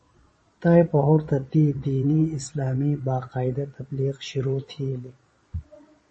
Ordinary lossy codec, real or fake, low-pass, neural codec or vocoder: MP3, 32 kbps; fake; 9.9 kHz; vocoder, 22.05 kHz, 80 mel bands, Vocos